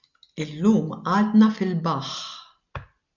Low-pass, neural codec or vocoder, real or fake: 7.2 kHz; none; real